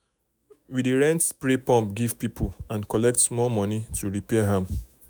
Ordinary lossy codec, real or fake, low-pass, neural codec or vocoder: none; fake; none; autoencoder, 48 kHz, 128 numbers a frame, DAC-VAE, trained on Japanese speech